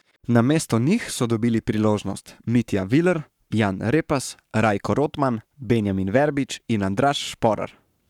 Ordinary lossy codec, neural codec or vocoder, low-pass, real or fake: none; codec, 44.1 kHz, 7.8 kbps, Pupu-Codec; 19.8 kHz; fake